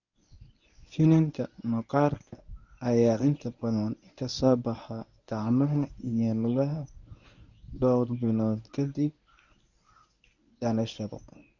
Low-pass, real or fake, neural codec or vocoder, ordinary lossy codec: 7.2 kHz; fake; codec, 24 kHz, 0.9 kbps, WavTokenizer, medium speech release version 1; none